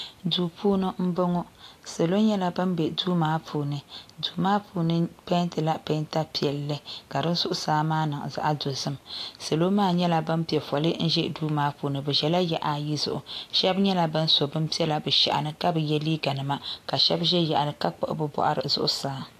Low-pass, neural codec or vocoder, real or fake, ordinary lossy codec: 14.4 kHz; none; real; AAC, 64 kbps